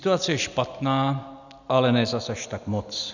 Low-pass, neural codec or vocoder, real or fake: 7.2 kHz; none; real